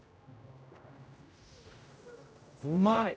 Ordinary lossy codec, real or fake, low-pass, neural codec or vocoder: none; fake; none; codec, 16 kHz, 0.5 kbps, X-Codec, HuBERT features, trained on general audio